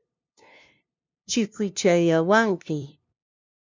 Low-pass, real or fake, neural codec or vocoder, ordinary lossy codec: 7.2 kHz; fake; codec, 16 kHz, 0.5 kbps, FunCodec, trained on LibriTTS, 25 frames a second; MP3, 64 kbps